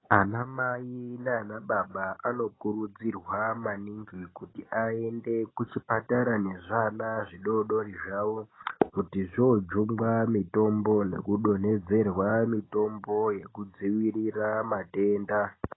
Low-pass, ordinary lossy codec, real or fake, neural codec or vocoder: 7.2 kHz; AAC, 16 kbps; real; none